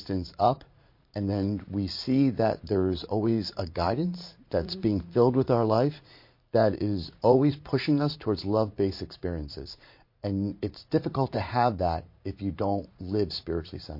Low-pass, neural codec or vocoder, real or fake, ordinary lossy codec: 5.4 kHz; vocoder, 44.1 kHz, 80 mel bands, Vocos; fake; MP3, 32 kbps